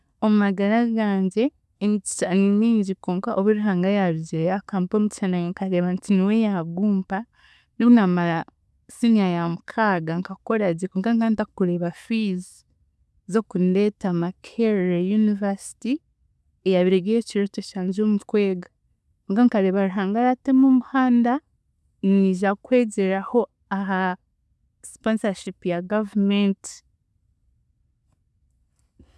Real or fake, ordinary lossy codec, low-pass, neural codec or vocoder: real; none; none; none